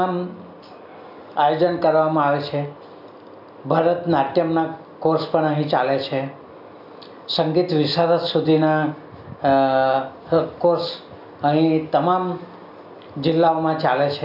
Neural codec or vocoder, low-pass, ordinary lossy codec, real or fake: none; 5.4 kHz; none; real